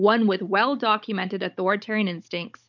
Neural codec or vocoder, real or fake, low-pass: codec, 16 kHz, 16 kbps, FunCodec, trained on Chinese and English, 50 frames a second; fake; 7.2 kHz